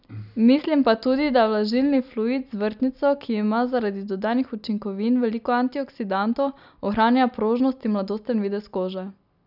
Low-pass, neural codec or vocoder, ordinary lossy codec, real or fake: 5.4 kHz; none; none; real